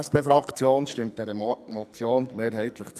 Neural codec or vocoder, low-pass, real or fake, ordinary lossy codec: codec, 44.1 kHz, 2.6 kbps, SNAC; 14.4 kHz; fake; none